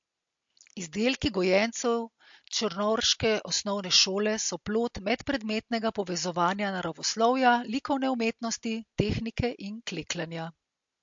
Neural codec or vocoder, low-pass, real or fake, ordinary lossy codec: none; 7.2 kHz; real; MP3, 48 kbps